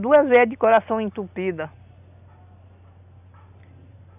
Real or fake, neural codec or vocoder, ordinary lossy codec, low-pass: real; none; none; 3.6 kHz